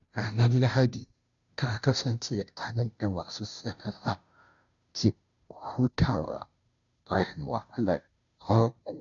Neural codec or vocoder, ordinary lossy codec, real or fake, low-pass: codec, 16 kHz, 0.5 kbps, FunCodec, trained on Chinese and English, 25 frames a second; none; fake; 7.2 kHz